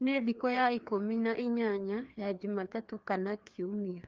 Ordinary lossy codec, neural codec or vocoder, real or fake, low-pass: Opus, 32 kbps; codec, 16 kHz, 2 kbps, FreqCodec, larger model; fake; 7.2 kHz